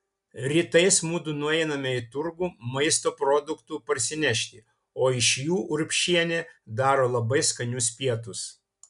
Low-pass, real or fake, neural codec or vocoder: 10.8 kHz; real; none